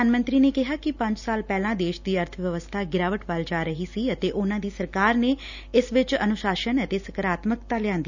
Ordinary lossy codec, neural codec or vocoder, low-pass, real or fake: none; none; 7.2 kHz; real